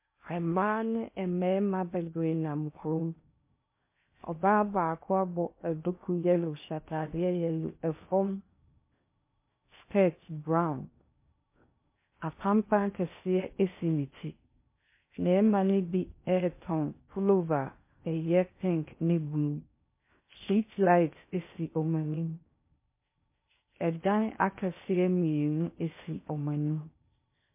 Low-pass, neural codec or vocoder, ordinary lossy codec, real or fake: 3.6 kHz; codec, 16 kHz in and 24 kHz out, 0.8 kbps, FocalCodec, streaming, 65536 codes; MP3, 24 kbps; fake